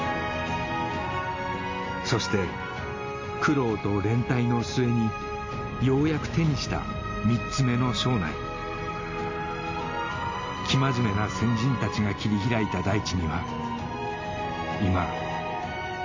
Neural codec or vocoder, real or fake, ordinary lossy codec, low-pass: none; real; MP3, 48 kbps; 7.2 kHz